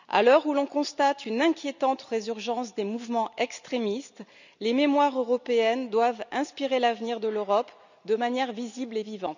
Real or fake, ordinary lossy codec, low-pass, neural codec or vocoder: real; none; 7.2 kHz; none